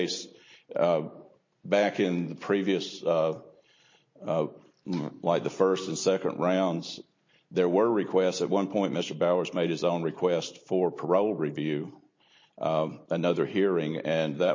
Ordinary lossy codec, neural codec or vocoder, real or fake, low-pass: MP3, 32 kbps; none; real; 7.2 kHz